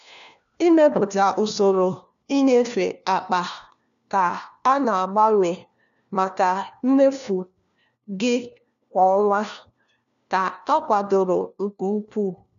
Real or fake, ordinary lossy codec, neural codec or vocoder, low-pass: fake; none; codec, 16 kHz, 1 kbps, FunCodec, trained on LibriTTS, 50 frames a second; 7.2 kHz